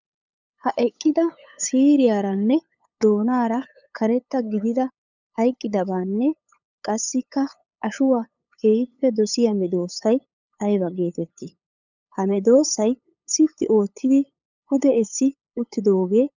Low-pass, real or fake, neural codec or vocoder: 7.2 kHz; fake; codec, 16 kHz, 8 kbps, FunCodec, trained on LibriTTS, 25 frames a second